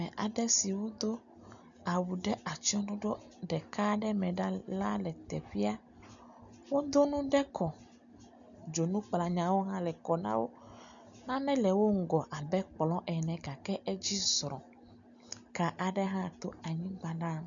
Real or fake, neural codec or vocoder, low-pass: real; none; 7.2 kHz